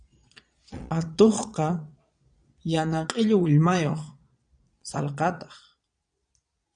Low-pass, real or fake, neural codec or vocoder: 9.9 kHz; fake; vocoder, 22.05 kHz, 80 mel bands, Vocos